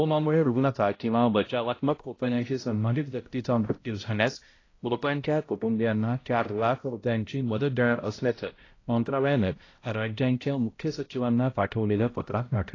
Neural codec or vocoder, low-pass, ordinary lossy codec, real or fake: codec, 16 kHz, 0.5 kbps, X-Codec, HuBERT features, trained on balanced general audio; 7.2 kHz; AAC, 32 kbps; fake